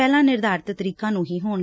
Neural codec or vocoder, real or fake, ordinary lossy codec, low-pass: none; real; none; none